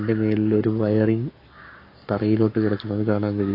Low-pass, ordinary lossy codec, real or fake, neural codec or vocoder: 5.4 kHz; AAC, 32 kbps; fake; codec, 44.1 kHz, 7.8 kbps, Pupu-Codec